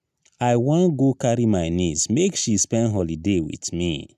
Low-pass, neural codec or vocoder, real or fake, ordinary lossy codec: 14.4 kHz; none; real; none